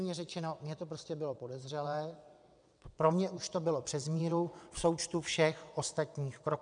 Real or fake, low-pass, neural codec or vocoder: fake; 9.9 kHz; vocoder, 22.05 kHz, 80 mel bands, WaveNeXt